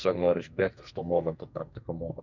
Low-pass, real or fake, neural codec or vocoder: 7.2 kHz; fake; codec, 44.1 kHz, 2.6 kbps, SNAC